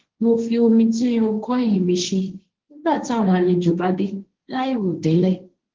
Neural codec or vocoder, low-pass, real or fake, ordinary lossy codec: codec, 16 kHz, 1.1 kbps, Voila-Tokenizer; 7.2 kHz; fake; Opus, 16 kbps